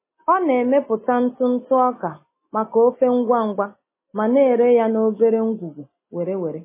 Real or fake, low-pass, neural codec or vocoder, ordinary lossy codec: real; 3.6 kHz; none; MP3, 16 kbps